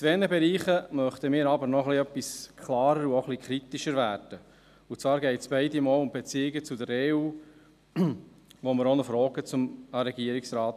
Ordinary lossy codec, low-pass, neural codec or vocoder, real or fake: none; 14.4 kHz; none; real